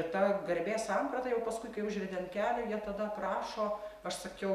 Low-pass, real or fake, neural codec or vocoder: 14.4 kHz; real; none